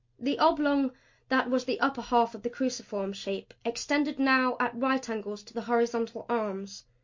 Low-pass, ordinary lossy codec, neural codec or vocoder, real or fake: 7.2 kHz; MP3, 48 kbps; none; real